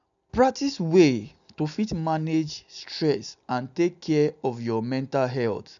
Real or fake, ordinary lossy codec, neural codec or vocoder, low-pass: real; none; none; 7.2 kHz